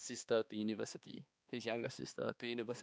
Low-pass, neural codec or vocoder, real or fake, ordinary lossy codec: none; codec, 16 kHz, 2 kbps, X-Codec, HuBERT features, trained on balanced general audio; fake; none